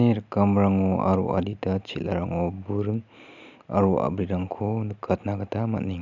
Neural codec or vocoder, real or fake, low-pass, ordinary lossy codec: none; real; 7.2 kHz; none